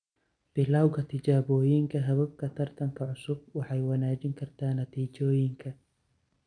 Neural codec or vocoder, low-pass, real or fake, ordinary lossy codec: none; 9.9 kHz; real; none